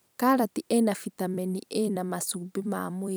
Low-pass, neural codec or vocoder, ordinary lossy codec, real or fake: none; vocoder, 44.1 kHz, 128 mel bands every 256 samples, BigVGAN v2; none; fake